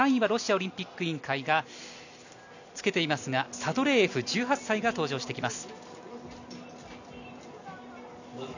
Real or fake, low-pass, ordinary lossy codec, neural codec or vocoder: real; 7.2 kHz; none; none